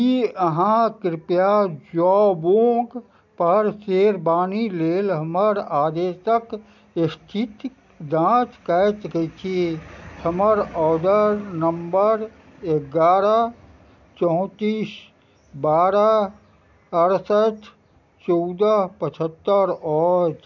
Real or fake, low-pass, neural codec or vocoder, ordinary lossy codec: real; 7.2 kHz; none; none